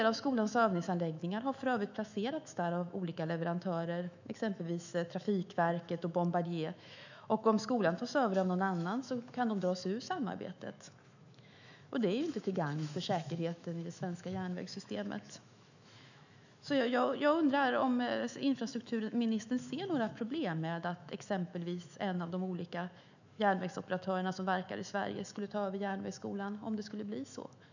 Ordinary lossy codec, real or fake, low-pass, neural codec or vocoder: none; fake; 7.2 kHz; autoencoder, 48 kHz, 128 numbers a frame, DAC-VAE, trained on Japanese speech